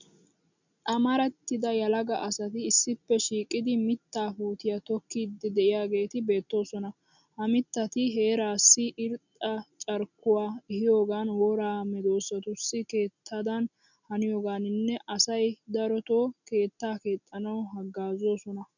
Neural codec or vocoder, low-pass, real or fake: none; 7.2 kHz; real